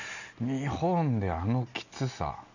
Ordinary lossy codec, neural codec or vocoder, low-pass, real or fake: none; none; 7.2 kHz; real